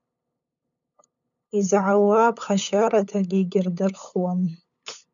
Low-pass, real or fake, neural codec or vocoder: 7.2 kHz; fake; codec, 16 kHz, 8 kbps, FunCodec, trained on LibriTTS, 25 frames a second